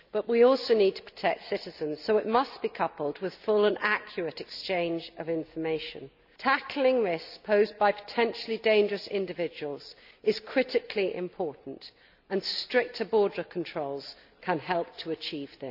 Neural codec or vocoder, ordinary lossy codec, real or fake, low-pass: none; none; real; 5.4 kHz